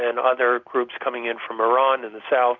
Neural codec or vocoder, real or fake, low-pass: none; real; 7.2 kHz